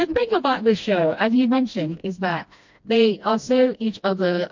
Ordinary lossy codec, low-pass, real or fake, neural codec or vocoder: MP3, 48 kbps; 7.2 kHz; fake; codec, 16 kHz, 1 kbps, FreqCodec, smaller model